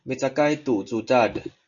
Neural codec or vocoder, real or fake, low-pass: none; real; 7.2 kHz